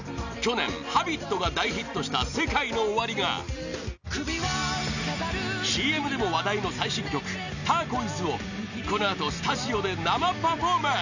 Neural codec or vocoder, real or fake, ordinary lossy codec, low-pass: none; real; none; 7.2 kHz